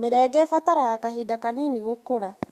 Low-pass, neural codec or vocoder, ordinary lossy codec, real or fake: 14.4 kHz; codec, 32 kHz, 1.9 kbps, SNAC; Opus, 64 kbps; fake